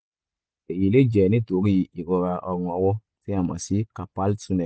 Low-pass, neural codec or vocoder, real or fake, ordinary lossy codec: none; none; real; none